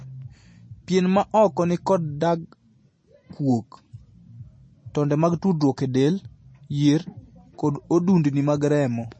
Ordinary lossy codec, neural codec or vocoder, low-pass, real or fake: MP3, 32 kbps; none; 9.9 kHz; real